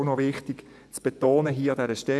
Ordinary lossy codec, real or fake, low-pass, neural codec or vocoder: none; fake; none; vocoder, 24 kHz, 100 mel bands, Vocos